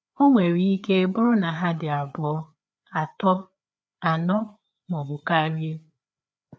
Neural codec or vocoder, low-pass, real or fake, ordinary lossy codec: codec, 16 kHz, 4 kbps, FreqCodec, larger model; none; fake; none